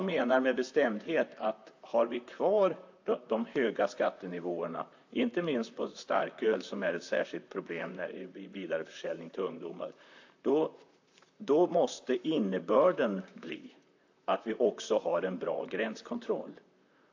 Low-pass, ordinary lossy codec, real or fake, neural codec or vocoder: 7.2 kHz; AAC, 48 kbps; fake; vocoder, 44.1 kHz, 128 mel bands, Pupu-Vocoder